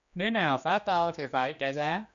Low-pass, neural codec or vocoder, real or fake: 7.2 kHz; codec, 16 kHz, 2 kbps, X-Codec, HuBERT features, trained on general audio; fake